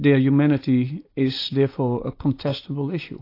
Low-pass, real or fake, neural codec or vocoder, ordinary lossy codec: 5.4 kHz; real; none; AAC, 32 kbps